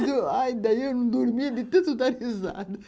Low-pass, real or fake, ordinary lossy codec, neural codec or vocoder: none; real; none; none